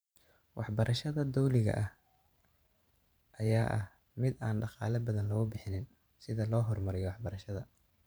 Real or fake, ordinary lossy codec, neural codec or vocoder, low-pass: fake; none; vocoder, 44.1 kHz, 128 mel bands every 512 samples, BigVGAN v2; none